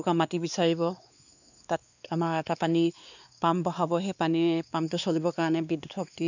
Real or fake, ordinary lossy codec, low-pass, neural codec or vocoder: fake; none; 7.2 kHz; codec, 16 kHz, 2 kbps, X-Codec, WavLM features, trained on Multilingual LibriSpeech